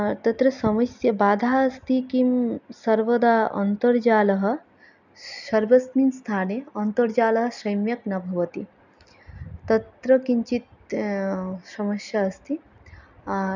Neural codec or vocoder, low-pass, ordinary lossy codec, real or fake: none; 7.2 kHz; none; real